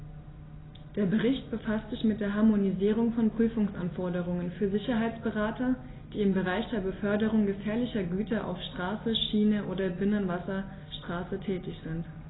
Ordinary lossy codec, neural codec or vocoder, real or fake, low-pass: AAC, 16 kbps; none; real; 7.2 kHz